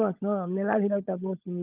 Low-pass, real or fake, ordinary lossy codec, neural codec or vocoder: 3.6 kHz; fake; Opus, 32 kbps; codec, 16 kHz, 16 kbps, FunCodec, trained on LibriTTS, 50 frames a second